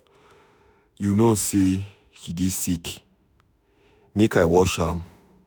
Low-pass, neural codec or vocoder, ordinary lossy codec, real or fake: none; autoencoder, 48 kHz, 32 numbers a frame, DAC-VAE, trained on Japanese speech; none; fake